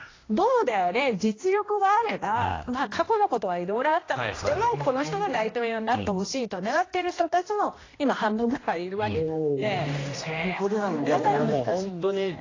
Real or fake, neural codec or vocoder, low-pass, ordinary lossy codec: fake; codec, 16 kHz, 1 kbps, X-Codec, HuBERT features, trained on general audio; 7.2 kHz; AAC, 32 kbps